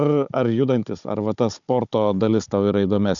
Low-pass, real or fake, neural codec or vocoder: 7.2 kHz; fake; codec, 16 kHz, 16 kbps, FunCodec, trained on Chinese and English, 50 frames a second